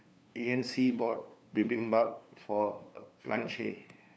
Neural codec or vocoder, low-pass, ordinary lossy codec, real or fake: codec, 16 kHz, 2 kbps, FunCodec, trained on LibriTTS, 25 frames a second; none; none; fake